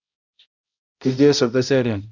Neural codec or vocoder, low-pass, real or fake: codec, 16 kHz, 0.5 kbps, X-Codec, HuBERT features, trained on balanced general audio; 7.2 kHz; fake